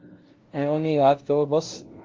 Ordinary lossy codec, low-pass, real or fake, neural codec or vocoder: Opus, 16 kbps; 7.2 kHz; fake; codec, 16 kHz, 0.5 kbps, FunCodec, trained on LibriTTS, 25 frames a second